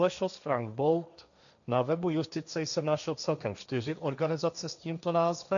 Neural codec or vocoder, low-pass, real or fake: codec, 16 kHz, 1.1 kbps, Voila-Tokenizer; 7.2 kHz; fake